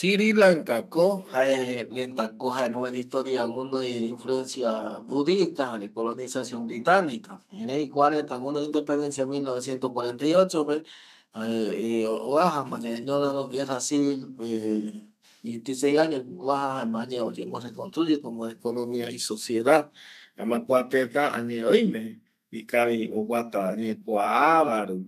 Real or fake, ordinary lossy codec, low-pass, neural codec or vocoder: fake; none; 14.4 kHz; codec, 32 kHz, 1.9 kbps, SNAC